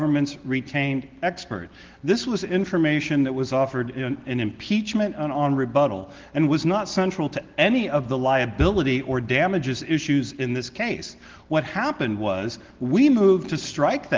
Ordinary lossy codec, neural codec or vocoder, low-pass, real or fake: Opus, 16 kbps; none; 7.2 kHz; real